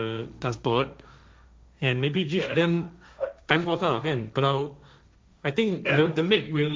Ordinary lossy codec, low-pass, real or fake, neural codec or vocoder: none; none; fake; codec, 16 kHz, 1.1 kbps, Voila-Tokenizer